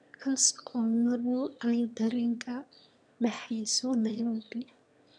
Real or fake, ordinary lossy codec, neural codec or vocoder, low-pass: fake; none; autoencoder, 22.05 kHz, a latent of 192 numbers a frame, VITS, trained on one speaker; 9.9 kHz